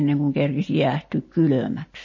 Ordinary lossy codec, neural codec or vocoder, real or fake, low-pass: MP3, 32 kbps; none; real; 7.2 kHz